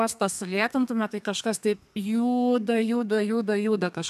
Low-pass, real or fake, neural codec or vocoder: 14.4 kHz; fake; codec, 32 kHz, 1.9 kbps, SNAC